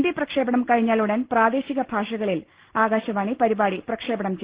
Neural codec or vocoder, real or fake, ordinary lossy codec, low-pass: none; real; Opus, 16 kbps; 3.6 kHz